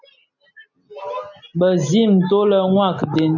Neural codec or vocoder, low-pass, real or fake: none; 7.2 kHz; real